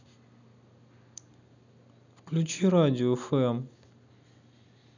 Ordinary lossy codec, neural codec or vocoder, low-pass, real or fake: none; none; 7.2 kHz; real